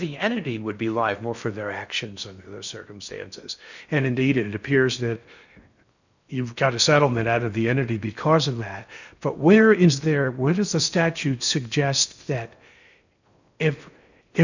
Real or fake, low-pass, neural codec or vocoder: fake; 7.2 kHz; codec, 16 kHz in and 24 kHz out, 0.6 kbps, FocalCodec, streaming, 4096 codes